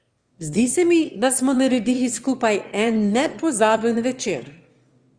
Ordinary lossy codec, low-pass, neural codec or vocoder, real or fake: Opus, 32 kbps; 9.9 kHz; autoencoder, 22.05 kHz, a latent of 192 numbers a frame, VITS, trained on one speaker; fake